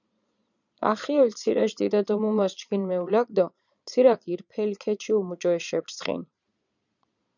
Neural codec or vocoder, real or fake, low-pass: vocoder, 22.05 kHz, 80 mel bands, Vocos; fake; 7.2 kHz